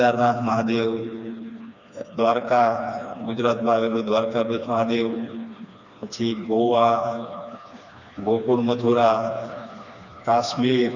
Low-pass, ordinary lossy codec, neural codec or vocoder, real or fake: 7.2 kHz; none; codec, 16 kHz, 2 kbps, FreqCodec, smaller model; fake